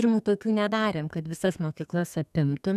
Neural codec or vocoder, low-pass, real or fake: codec, 32 kHz, 1.9 kbps, SNAC; 14.4 kHz; fake